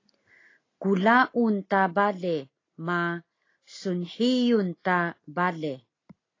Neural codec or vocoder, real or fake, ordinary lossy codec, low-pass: none; real; AAC, 32 kbps; 7.2 kHz